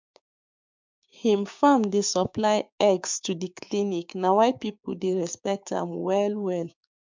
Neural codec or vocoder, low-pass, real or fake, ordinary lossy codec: codec, 16 kHz, 6 kbps, DAC; 7.2 kHz; fake; MP3, 64 kbps